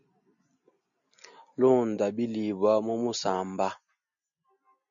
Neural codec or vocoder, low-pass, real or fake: none; 7.2 kHz; real